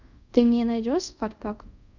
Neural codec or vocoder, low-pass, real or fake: codec, 24 kHz, 0.5 kbps, DualCodec; 7.2 kHz; fake